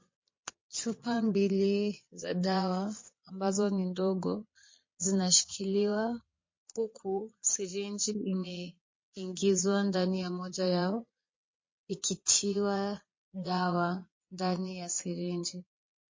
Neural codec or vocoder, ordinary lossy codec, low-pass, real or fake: vocoder, 22.05 kHz, 80 mel bands, Vocos; MP3, 32 kbps; 7.2 kHz; fake